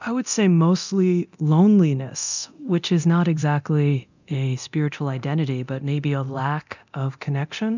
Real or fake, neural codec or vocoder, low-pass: fake; codec, 24 kHz, 0.9 kbps, DualCodec; 7.2 kHz